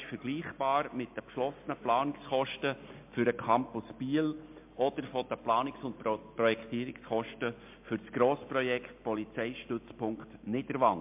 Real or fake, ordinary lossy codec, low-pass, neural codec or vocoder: real; MP3, 32 kbps; 3.6 kHz; none